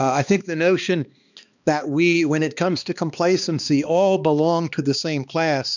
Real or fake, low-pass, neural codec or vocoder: fake; 7.2 kHz; codec, 16 kHz, 2 kbps, X-Codec, HuBERT features, trained on balanced general audio